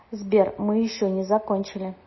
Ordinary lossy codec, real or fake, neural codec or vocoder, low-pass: MP3, 24 kbps; real; none; 7.2 kHz